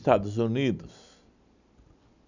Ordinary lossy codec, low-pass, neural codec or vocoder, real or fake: none; 7.2 kHz; none; real